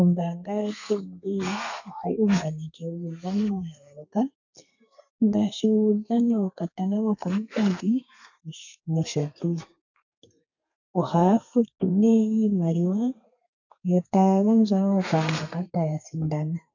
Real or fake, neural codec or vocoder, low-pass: fake; codec, 32 kHz, 1.9 kbps, SNAC; 7.2 kHz